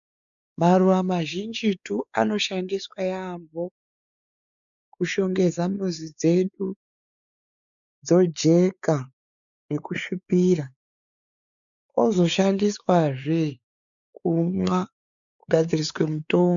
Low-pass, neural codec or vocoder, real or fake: 7.2 kHz; codec, 16 kHz, 4 kbps, X-Codec, WavLM features, trained on Multilingual LibriSpeech; fake